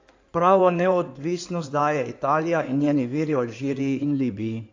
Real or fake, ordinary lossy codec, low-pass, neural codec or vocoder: fake; AAC, 48 kbps; 7.2 kHz; codec, 16 kHz in and 24 kHz out, 2.2 kbps, FireRedTTS-2 codec